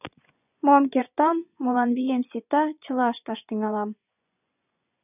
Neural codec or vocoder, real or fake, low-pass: vocoder, 24 kHz, 100 mel bands, Vocos; fake; 3.6 kHz